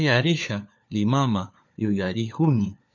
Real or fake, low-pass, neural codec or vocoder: fake; 7.2 kHz; codec, 16 kHz, 4 kbps, FunCodec, trained on LibriTTS, 50 frames a second